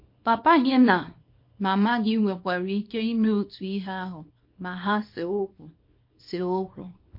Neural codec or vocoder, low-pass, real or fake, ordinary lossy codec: codec, 24 kHz, 0.9 kbps, WavTokenizer, small release; 5.4 kHz; fake; MP3, 32 kbps